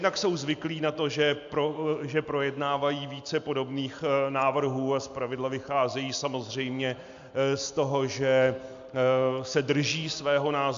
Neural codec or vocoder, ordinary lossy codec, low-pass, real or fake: none; AAC, 64 kbps; 7.2 kHz; real